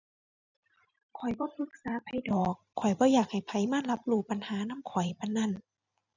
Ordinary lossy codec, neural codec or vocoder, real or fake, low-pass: none; vocoder, 44.1 kHz, 128 mel bands every 256 samples, BigVGAN v2; fake; 7.2 kHz